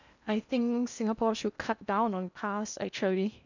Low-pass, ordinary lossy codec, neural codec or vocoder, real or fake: 7.2 kHz; none; codec, 16 kHz in and 24 kHz out, 0.8 kbps, FocalCodec, streaming, 65536 codes; fake